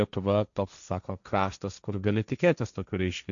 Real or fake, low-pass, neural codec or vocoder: fake; 7.2 kHz; codec, 16 kHz, 1.1 kbps, Voila-Tokenizer